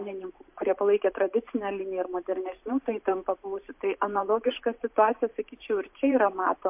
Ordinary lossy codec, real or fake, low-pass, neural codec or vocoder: MP3, 32 kbps; fake; 3.6 kHz; vocoder, 44.1 kHz, 128 mel bands every 512 samples, BigVGAN v2